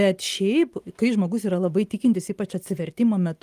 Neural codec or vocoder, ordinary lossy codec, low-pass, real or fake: none; Opus, 32 kbps; 14.4 kHz; real